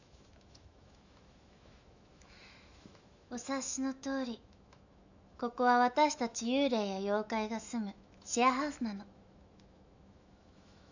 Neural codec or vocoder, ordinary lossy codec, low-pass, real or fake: autoencoder, 48 kHz, 128 numbers a frame, DAC-VAE, trained on Japanese speech; none; 7.2 kHz; fake